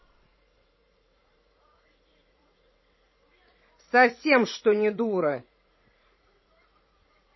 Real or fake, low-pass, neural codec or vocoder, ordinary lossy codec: fake; 7.2 kHz; vocoder, 44.1 kHz, 80 mel bands, Vocos; MP3, 24 kbps